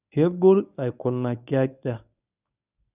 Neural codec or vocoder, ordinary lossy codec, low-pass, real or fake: codec, 24 kHz, 0.9 kbps, WavTokenizer, medium speech release version 1; none; 3.6 kHz; fake